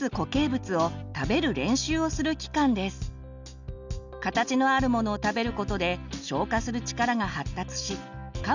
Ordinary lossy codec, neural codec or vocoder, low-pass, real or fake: none; none; 7.2 kHz; real